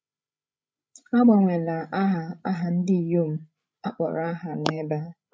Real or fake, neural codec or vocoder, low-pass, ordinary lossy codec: fake; codec, 16 kHz, 16 kbps, FreqCodec, larger model; none; none